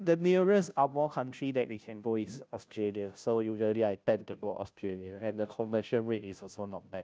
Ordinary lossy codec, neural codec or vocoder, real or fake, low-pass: none; codec, 16 kHz, 0.5 kbps, FunCodec, trained on Chinese and English, 25 frames a second; fake; none